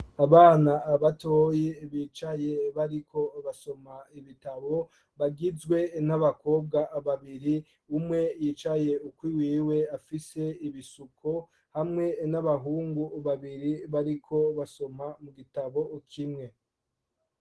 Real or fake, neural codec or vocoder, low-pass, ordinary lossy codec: real; none; 10.8 kHz; Opus, 16 kbps